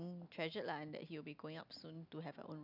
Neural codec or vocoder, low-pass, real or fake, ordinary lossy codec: none; 5.4 kHz; real; none